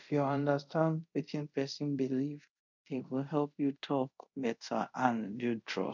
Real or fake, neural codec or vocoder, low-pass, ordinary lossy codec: fake; codec, 24 kHz, 0.5 kbps, DualCodec; 7.2 kHz; none